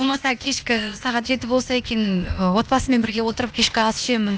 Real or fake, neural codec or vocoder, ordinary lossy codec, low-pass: fake; codec, 16 kHz, 0.8 kbps, ZipCodec; none; none